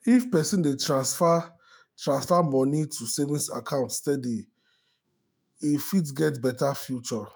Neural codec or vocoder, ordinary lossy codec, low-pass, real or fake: autoencoder, 48 kHz, 128 numbers a frame, DAC-VAE, trained on Japanese speech; none; none; fake